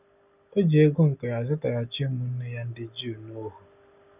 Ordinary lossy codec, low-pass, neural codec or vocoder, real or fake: none; 3.6 kHz; none; real